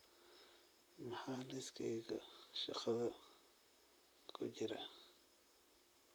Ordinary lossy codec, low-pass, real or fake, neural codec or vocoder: none; none; fake; vocoder, 44.1 kHz, 128 mel bands, Pupu-Vocoder